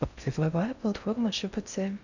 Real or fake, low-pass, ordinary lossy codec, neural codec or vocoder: fake; 7.2 kHz; none; codec, 16 kHz in and 24 kHz out, 0.6 kbps, FocalCodec, streaming, 4096 codes